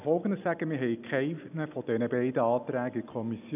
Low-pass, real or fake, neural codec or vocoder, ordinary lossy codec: 3.6 kHz; real; none; none